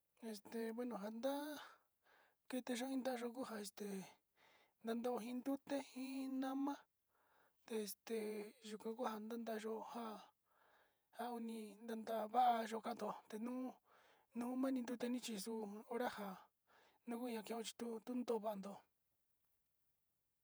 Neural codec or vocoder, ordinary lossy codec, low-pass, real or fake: vocoder, 48 kHz, 128 mel bands, Vocos; none; none; fake